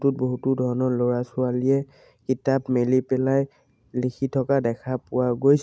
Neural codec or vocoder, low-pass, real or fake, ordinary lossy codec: none; none; real; none